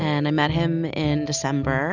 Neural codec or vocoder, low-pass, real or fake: none; 7.2 kHz; real